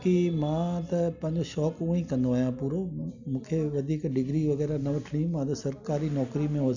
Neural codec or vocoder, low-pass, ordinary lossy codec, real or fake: none; 7.2 kHz; none; real